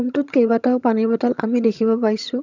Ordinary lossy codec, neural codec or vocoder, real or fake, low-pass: none; vocoder, 22.05 kHz, 80 mel bands, HiFi-GAN; fake; 7.2 kHz